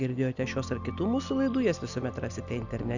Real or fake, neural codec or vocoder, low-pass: real; none; 7.2 kHz